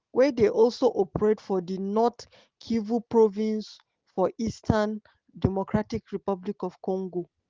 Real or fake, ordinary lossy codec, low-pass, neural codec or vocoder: real; Opus, 16 kbps; 7.2 kHz; none